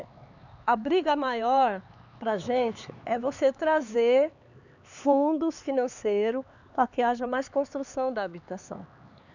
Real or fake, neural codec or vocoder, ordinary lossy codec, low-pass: fake; codec, 16 kHz, 4 kbps, X-Codec, HuBERT features, trained on LibriSpeech; none; 7.2 kHz